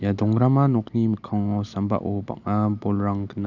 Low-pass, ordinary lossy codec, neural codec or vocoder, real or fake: 7.2 kHz; none; none; real